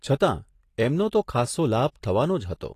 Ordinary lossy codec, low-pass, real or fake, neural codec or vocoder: AAC, 48 kbps; 14.4 kHz; real; none